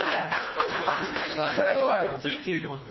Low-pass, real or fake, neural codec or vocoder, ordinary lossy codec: 7.2 kHz; fake; codec, 24 kHz, 1.5 kbps, HILCodec; MP3, 24 kbps